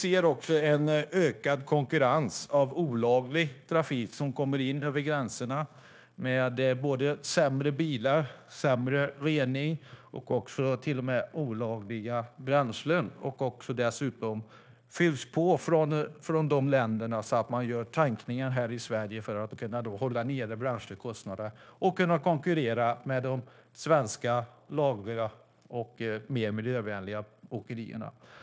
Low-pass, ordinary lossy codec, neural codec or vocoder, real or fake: none; none; codec, 16 kHz, 0.9 kbps, LongCat-Audio-Codec; fake